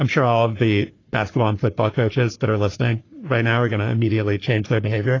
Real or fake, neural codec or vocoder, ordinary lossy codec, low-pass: fake; codec, 44.1 kHz, 3.4 kbps, Pupu-Codec; AAC, 32 kbps; 7.2 kHz